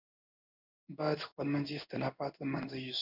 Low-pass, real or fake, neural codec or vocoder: 5.4 kHz; fake; codec, 16 kHz in and 24 kHz out, 1 kbps, XY-Tokenizer